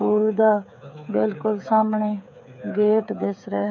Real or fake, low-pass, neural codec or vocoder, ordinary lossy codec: fake; 7.2 kHz; codec, 16 kHz, 8 kbps, FreqCodec, smaller model; none